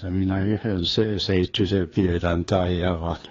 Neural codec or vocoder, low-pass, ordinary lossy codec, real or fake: codec, 16 kHz, 2 kbps, FreqCodec, larger model; 7.2 kHz; AAC, 32 kbps; fake